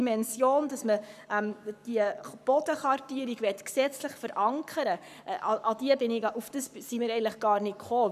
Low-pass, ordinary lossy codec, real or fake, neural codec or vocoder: 14.4 kHz; none; fake; codec, 44.1 kHz, 7.8 kbps, Pupu-Codec